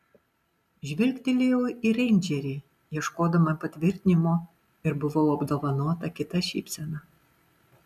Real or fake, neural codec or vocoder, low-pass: real; none; 14.4 kHz